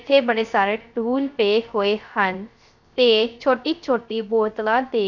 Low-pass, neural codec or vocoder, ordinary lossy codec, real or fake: 7.2 kHz; codec, 16 kHz, 0.3 kbps, FocalCodec; none; fake